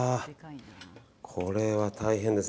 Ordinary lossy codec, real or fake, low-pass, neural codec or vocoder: none; real; none; none